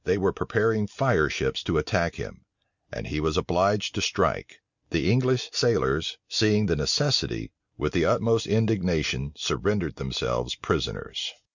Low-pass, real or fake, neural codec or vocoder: 7.2 kHz; real; none